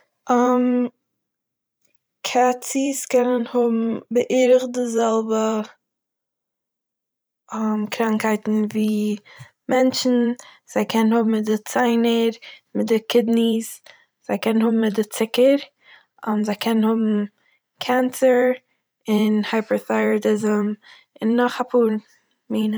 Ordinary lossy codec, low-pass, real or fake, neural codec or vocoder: none; none; fake; vocoder, 44.1 kHz, 128 mel bands every 512 samples, BigVGAN v2